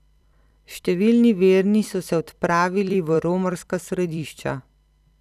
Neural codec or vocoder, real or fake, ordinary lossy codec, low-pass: vocoder, 44.1 kHz, 128 mel bands every 256 samples, BigVGAN v2; fake; none; 14.4 kHz